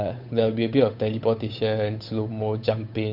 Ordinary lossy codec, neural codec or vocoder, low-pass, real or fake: none; codec, 16 kHz, 8 kbps, FunCodec, trained on Chinese and English, 25 frames a second; 5.4 kHz; fake